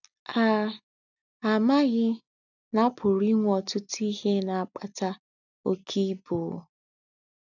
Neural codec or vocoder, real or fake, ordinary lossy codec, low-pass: none; real; none; 7.2 kHz